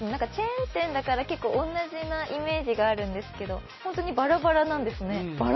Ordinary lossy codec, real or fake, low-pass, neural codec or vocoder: MP3, 24 kbps; real; 7.2 kHz; none